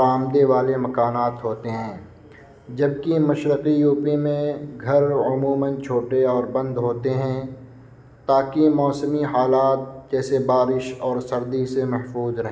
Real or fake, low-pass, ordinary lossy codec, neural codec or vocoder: real; none; none; none